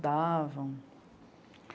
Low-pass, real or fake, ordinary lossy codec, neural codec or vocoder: none; real; none; none